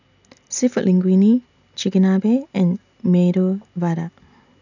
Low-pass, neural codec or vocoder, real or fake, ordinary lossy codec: 7.2 kHz; none; real; none